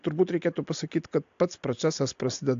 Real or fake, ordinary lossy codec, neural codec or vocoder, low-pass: real; AAC, 64 kbps; none; 7.2 kHz